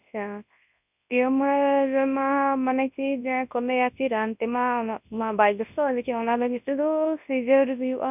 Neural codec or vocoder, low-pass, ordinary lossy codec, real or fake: codec, 24 kHz, 0.9 kbps, WavTokenizer, large speech release; 3.6 kHz; none; fake